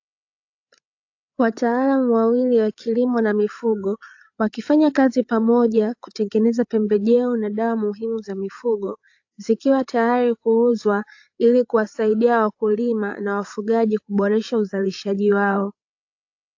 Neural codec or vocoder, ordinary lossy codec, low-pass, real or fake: codec, 16 kHz, 8 kbps, FreqCodec, larger model; AAC, 48 kbps; 7.2 kHz; fake